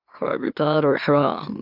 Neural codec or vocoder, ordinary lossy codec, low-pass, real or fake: autoencoder, 44.1 kHz, a latent of 192 numbers a frame, MeloTTS; AAC, 48 kbps; 5.4 kHz; fake